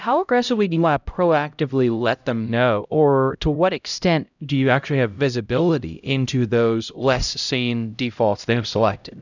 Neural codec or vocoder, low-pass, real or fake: codec, 16 kHz, 0.5 kbps, X-Codec, HuBERT features, trained on LibriSpeech; 7.2 kHz; fake